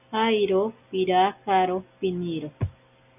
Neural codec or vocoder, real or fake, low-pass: none; real; 3.6 kHz